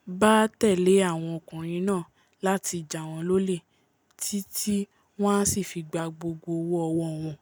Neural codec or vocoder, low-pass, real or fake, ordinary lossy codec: none; none; real; none